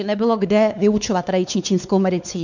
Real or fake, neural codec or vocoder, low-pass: fake; codec, 16 kHz, 4 kbps, X-Codec, WavLM features, trained on Multilingual LibriSpeech; 7.2 kHz